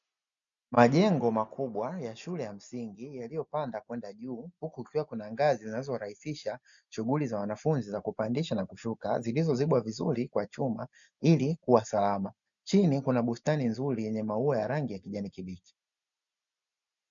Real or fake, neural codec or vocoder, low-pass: real; none; 7.2 kHz